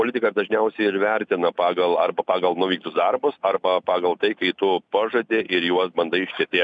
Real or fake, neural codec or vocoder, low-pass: real; none; 9.9 kHz